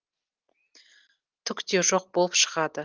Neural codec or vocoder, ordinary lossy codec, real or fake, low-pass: none; Opus, 24 kbps; real; 7.2 kHz